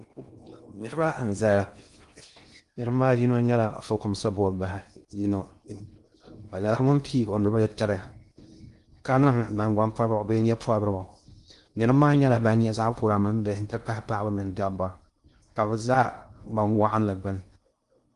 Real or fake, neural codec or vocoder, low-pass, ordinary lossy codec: fake; codec, 16 kHz in and 24 kHz out, 0.6 kbps, FocalCodec, streaming, 2048 codes; 10.8 kHz; Opus, 32 kbps